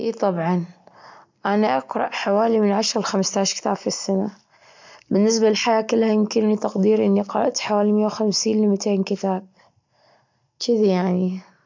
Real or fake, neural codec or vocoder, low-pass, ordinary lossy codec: real; none; 7.2 kHz; MP3, 64 kbps